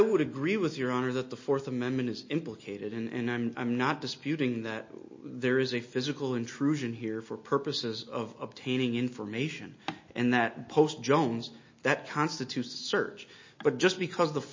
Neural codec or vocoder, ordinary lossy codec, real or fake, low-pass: none; MP3, 32 kbps; real; 7.2 kHz